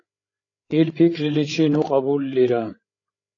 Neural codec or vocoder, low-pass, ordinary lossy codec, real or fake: codec, 16 kHz, 8 kbps, FreqCodec, larger model; 7.2 kHz; AAC, 32 kbps; fake